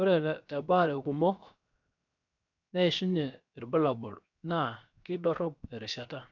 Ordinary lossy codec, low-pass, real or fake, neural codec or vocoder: none; 7.2 kHz; fake; codec, 16 kHz, 0.7 kbps, FocalCodec